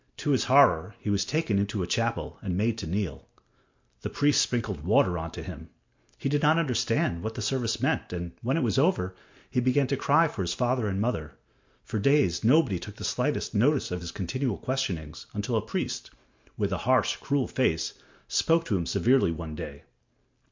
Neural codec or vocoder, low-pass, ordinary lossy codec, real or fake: none; 7.2 kHz; MP3, 48 kbps; real